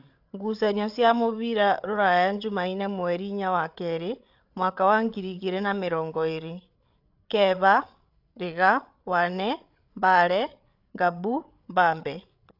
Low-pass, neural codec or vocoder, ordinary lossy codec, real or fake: 5.4 kHz; codec, 16 kHz, 8 kbps, FreqCodec, larger model; AAC, 48 kbps; fake